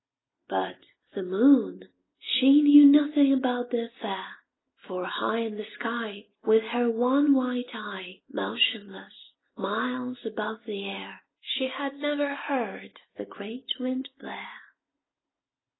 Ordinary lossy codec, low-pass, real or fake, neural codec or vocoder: AAC, 16 kbps; 7.2 kHz; real; none